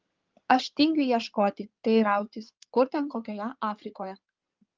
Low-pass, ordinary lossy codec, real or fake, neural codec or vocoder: 7.2 kHz; Opus, 32 kbps; fake; codec, 16 kHz in and 24 kHz out, 2.2 kbps, FireRedTTS-2 codec